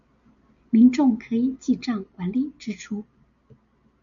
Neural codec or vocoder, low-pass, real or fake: none; 7.2 kHz; real